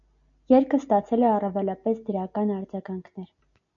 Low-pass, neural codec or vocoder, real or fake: 7.2 kHz; none; real